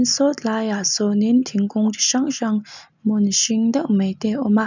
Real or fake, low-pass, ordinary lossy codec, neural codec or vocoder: real; 7.2 kHz; none; none